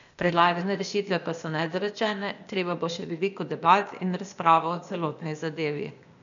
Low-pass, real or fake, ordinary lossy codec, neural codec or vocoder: 7.2 kHz; fake; none; codec, 16 kHz, 0.8 kbps, ZipCodec